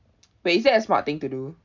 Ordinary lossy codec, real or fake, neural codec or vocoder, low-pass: none; real; none; 7.2 kHz